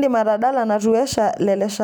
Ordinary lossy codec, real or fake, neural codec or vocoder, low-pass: none; real; none; none